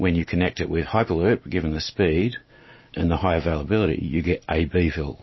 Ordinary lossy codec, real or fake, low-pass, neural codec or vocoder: MP3, 24 kbps; real; 7.2 kHz; none